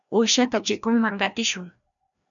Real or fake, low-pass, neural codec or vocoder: fake; 7.2 kHz; codec, 16 kHz, 1 kbps, FreqCodec, larger model